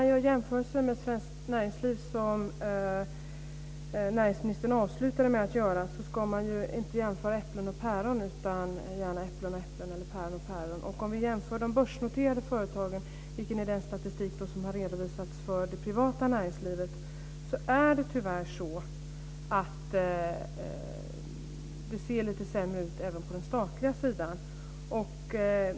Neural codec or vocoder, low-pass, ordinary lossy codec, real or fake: none; none; none; real